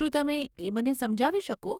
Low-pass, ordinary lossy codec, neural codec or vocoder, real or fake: 19.8 kHz; none; codec, 44.1 kHz, 2.6 kbps, DAC; fake